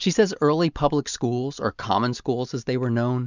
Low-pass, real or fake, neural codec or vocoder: 7.2 kHz; real; none